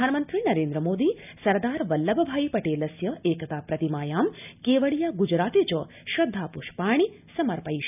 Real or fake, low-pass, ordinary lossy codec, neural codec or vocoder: real; 3.6 kHz; none; none